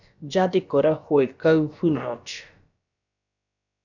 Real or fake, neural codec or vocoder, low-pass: fake; codec, 16 kHz, about 1 kbps, DyCAST, with the encoder's durations; 7.2 kHz